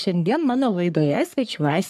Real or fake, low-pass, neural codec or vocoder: fake; 14.4 kHz; codec, 44.1 kHz, 3.4 kbps, Pupu-Codec